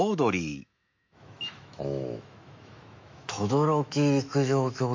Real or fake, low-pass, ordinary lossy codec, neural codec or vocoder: fake; 7.2 kHz; MP3, 64 kbps; autoencoder, 48 kHz, 128 numbers a frame, DAC-VAE, trained on Japanese speech